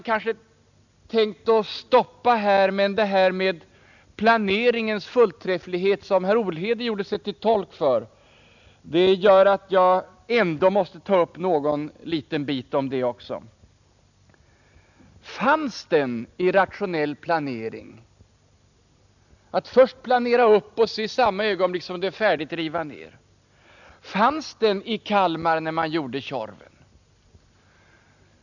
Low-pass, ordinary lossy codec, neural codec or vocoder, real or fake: 7.2 kHz; none; none; real